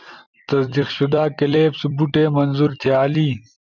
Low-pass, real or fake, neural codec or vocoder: 7.2 kHz; fake; vocoder, 44.1 kHz, 128 mel bands every 256 samples, BigVGAN v2